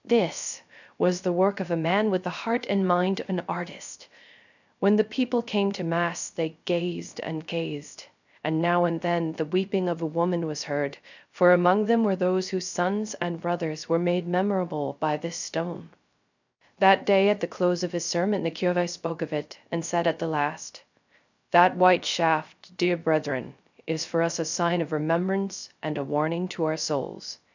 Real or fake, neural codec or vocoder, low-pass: fake; codec, 16 kHz, 0.3 kbps, FocalCodec; 7.2 kHz